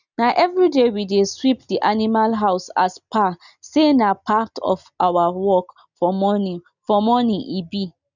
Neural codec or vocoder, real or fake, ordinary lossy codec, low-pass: none; real; none; 7.2 kHz